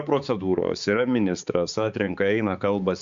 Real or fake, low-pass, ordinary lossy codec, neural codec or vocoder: fake; 7.2 kHz; Opus, 64 kbps; codec, 16 kHz, 4 kbps, X-Codec, HuBERT features, trained on balanced general audio